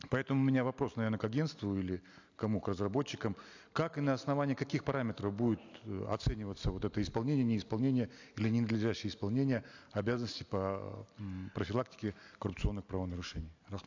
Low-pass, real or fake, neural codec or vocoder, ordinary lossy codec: 7.2 kHz; real; none; AAC, 48 kbps